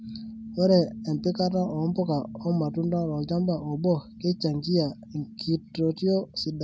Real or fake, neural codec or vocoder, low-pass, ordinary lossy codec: real; none; none; none